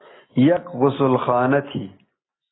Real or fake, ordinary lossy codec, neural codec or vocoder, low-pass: real; AAC, 16 kbps; none; 7.2 kHz